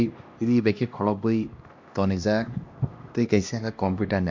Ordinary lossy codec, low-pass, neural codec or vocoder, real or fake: MP3, 48 kbps; 7.2 kHz; codec, 16 kHz, 1 kbps, X-Codec, HuBERT features, trained on LibriSpeech; fake